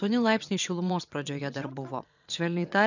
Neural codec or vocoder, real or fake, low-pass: vocoder, 22.05 kHz, 80 mel bands, WaveNeXt; fake; 7.2 kHz